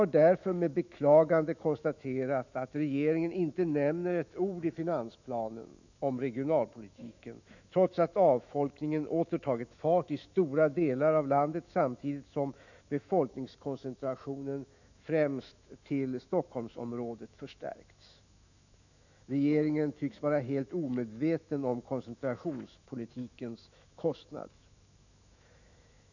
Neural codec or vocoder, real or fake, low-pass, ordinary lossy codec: autoencoder, 48 kHz, 128 numbers a frame, DAC-VAE, trained on Japanese speech; fake; 7.2 kHz; none